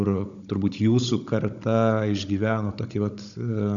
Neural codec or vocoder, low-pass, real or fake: codec, 16 kHz, 16 kbps, FunCodec, trained on Chinese and English, 50 frames a second; 7.2 kHz; fake